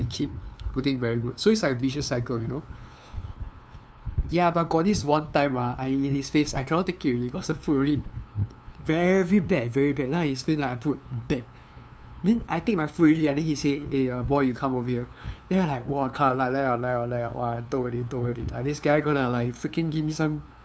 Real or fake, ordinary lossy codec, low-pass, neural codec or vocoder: fake; none; none; codec, 16 kHz, 2 kbps, FunCodec, trained on LibriTTS, 25 frames a second